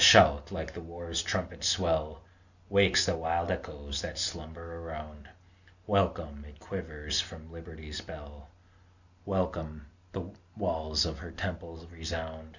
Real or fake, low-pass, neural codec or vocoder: real; 7.2 kHz; none